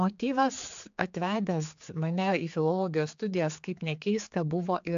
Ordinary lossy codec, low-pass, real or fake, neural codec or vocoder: AAC, 64 kbps; 7.2 kHz; fake; codec, 16 kHz, 4 kbps, X-Codec, HuBERT features, trained on general audio